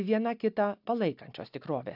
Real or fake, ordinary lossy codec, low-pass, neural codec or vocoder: real; AAC, 48 kbps; 5.4 kHz; none